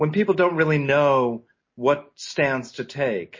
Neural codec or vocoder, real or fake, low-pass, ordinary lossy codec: none; real; 7.2 kHz; MP3, 32 kbps